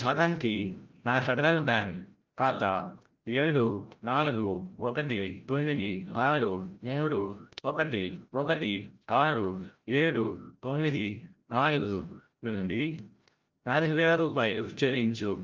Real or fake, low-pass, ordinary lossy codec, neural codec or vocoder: fake; 7.2 kHz; Opus, 24 kbps; codec, 16 kHz, 0.5 kbps, FreqCodec, larger model